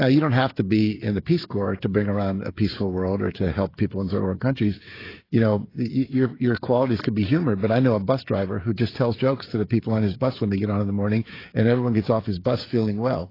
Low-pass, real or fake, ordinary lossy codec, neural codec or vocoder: 5.4 kHz; fake; AAC, 24 kbps; codec, 16 kHz, 16 kbps, FreqCodec, smaller model